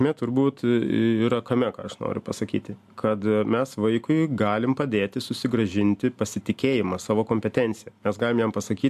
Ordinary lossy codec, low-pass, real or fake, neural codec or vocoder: AAC, 96 kbps; 14.4 kHz; real; none